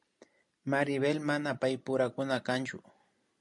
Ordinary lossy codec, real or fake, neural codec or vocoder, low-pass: MP3, 64 kbps; real; none; 10.8 kHz